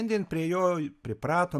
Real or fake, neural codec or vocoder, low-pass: real; none; 14.4 kHz